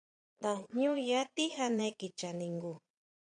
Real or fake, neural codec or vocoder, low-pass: fake; vocoder, 22.05 kHz, 80 mel bands, Vocos; 9.9 kHz